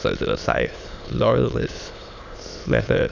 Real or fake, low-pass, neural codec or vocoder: fake; 7.2 kHz; autoencoder, 22.05 kHz, a latent of 192 numbers a frame, VITS, trained on many speakers